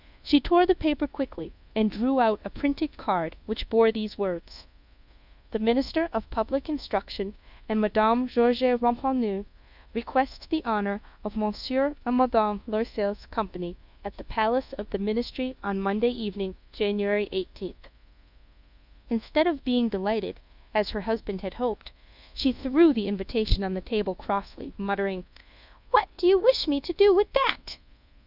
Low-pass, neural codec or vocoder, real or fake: 5.4 kHz; codec, 24 kHz, 1.2 kbps, DualCodec; fake